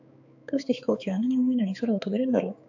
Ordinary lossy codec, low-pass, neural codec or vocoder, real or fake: AAC, 48 kbps; 7.2 kHz; codec, 16 kHz, 4 kbps, X-Codec, HuBERT features, trained on balanced general audio; fake